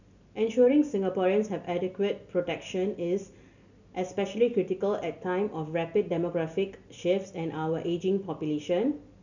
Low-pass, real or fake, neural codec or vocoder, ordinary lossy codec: 7.2 kHz; real; none; none